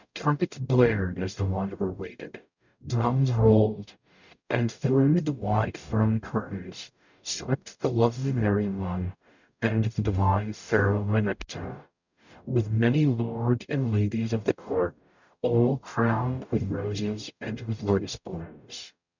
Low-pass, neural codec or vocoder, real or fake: 7.2 kHz; codec, 44.1 kHz, 0.9 kbps, DAC; fake